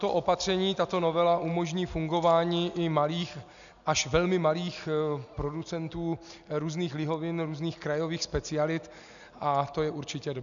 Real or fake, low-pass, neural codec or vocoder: real; 7.2 kHz; none